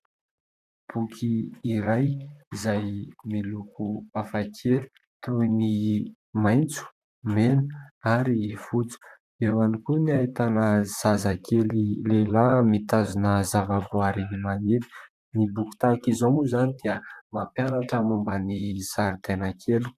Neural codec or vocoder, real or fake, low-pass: vocoder, 44.1 kHz, 128 mel bands, Pupu-Vocoder; fake; 14.4 kHz